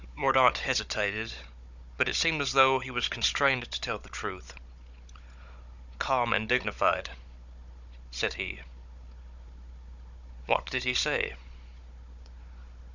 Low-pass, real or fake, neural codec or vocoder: 7.2 kHz; fake; codec, 16 kHz, 16 kbps, FunCodec, trained on Chinese and English, 50 frames a second